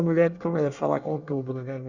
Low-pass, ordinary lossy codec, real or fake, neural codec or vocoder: 7.2 kHz; Opus, 64 kbps; fake; codec, 24 kHz, 1 kbps, SNAC